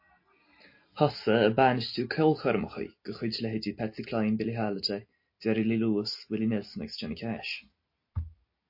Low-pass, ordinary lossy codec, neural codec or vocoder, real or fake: 5.4 kHz; MP3, 32 kbps; none; real